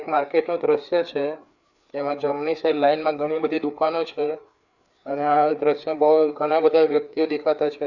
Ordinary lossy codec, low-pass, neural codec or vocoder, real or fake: Opus, 64 kbps; 7.2 kHz; codec, 16 kHz, 4 kbps, FreqCodec, larger model; fake